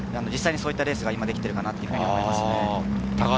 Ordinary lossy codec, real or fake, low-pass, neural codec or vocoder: none; real; none; none